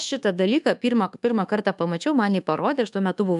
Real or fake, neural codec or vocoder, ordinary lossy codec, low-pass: fake; codec, 24 kHz, 1.2 kbps, DualCodec; MP3, 96 kbps; 10.8 kHz